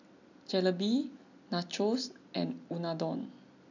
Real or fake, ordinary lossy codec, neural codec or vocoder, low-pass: real; none; none; 7.2 kHz